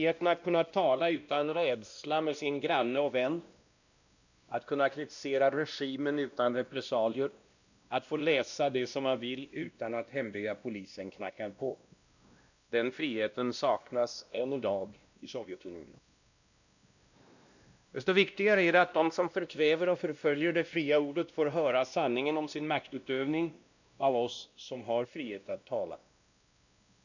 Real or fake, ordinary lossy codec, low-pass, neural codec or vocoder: fake; none; 7.2 kHz; codec, 16 kHz, 1 kbps, X-Codec, WavLM features, trained on Multilingual LibriSpeech